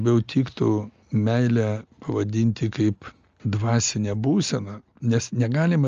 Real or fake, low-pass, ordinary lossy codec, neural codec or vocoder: real; 7.2 kHz; Opus, 32 kbps; none